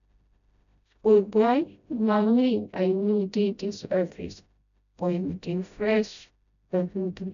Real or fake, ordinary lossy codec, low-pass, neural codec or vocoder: fake; none; 7.2 kHz; codec, 16 kHz, 0.5 kbps, FreqCodec, smaller model